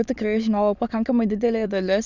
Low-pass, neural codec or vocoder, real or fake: 7.2 kHz; autoencoder, 22.05 kHz, a latent of 192 numbers a frame, VITS, trained on many speakers; fake